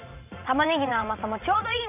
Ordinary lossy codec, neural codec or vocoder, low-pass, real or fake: AAC, 32 kbps; vocoder, 44.1 kHz, 80 mel bands, Vocos; 3.6 kHz; fake